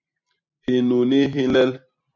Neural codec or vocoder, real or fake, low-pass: vocoder, 44.1 kHz, 128 mel bands every 256 samples, BigVGAN v2; fake; 7.2 kHz